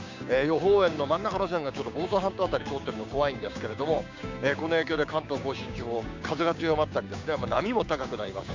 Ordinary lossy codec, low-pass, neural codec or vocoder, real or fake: MP3, 64 kbps; 7.2 kHz; codec, 16 kHz, 6 kbps, DAC; fake